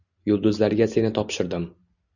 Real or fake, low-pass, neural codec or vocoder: real; 7.2 kHz; none